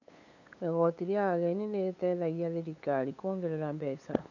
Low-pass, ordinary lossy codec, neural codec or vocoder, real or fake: 7.2 kHz; none; codec, 16 kHz, 8 kbps, FunCodec, trained on LibriTTS, 25 frames a second; fake